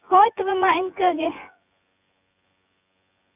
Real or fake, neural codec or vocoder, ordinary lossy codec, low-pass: fake; vocoder, 24 kHz, 100 mel bands, Vocos; none; 3.6 kHz